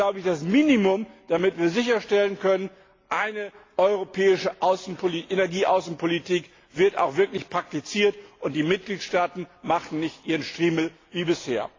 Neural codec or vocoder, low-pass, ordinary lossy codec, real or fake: none; 7.2 kHz; AAC, 32 kbps; real